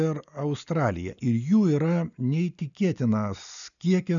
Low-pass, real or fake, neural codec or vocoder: 7.2 kHz; real; none